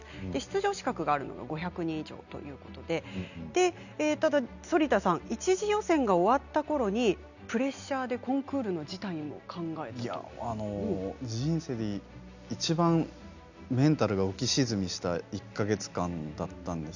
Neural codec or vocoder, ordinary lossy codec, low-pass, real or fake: none; none; 7.2 kHz; real